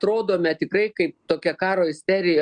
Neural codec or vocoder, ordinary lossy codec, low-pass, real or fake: none; MP3, 96 kbps; 9.9 kHz; real